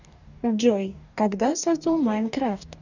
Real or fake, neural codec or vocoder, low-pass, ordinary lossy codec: fake; codec, 44.1 kHz, 2.6 kbps, DAC; 7.2 kHz; none